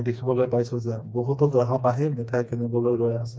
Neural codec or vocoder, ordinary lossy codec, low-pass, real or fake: codec, 16 kHz, 2 kbps, FreqCodec, smaller model; none; none; fake